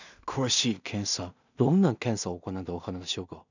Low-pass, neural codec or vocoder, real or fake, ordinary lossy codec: 7.2 kHz; codec, 16 kHz in and 24 kHz out, 0.4 kbps, LongCat-Audio-Codec, two codebook decoder; fake; none